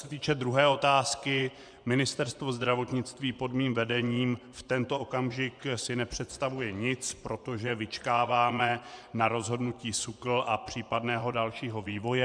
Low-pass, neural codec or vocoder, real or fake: 9.9 kHz; vocoder, 24 kHz, 100 mel bands, Vocos; fake